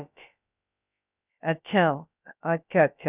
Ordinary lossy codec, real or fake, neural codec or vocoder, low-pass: none; fake; codec, 16 kHz, about 1 kbps, DyCAST, with the encoder's durations; 3.6 kHz